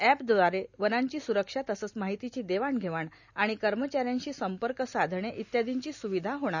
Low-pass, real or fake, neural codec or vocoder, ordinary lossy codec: 7.2 kHz; real; none; none